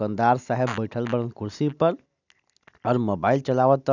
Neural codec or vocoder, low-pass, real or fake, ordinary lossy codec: none; 7.2 kHz; real; none